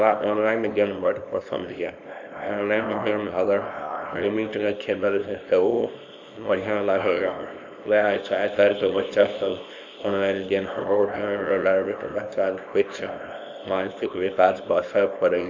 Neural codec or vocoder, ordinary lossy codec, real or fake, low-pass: codec, 24 kHz, 0.9 kbps, WavTokenizer, small release; none; fake; 7.2 kHz